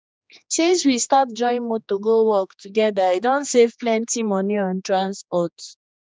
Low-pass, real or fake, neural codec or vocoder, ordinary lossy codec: none; fake; codec, 16 kHz, 2 kbps, X-Codec, HuBERT features, trained on general audio; none